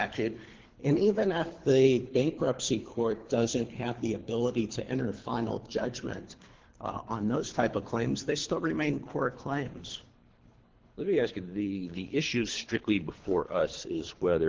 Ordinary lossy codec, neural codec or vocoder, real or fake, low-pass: Opus, 16 kbps; codec, 24 kHz, 3 kbps, HILCodec; fake; 7.2 kHz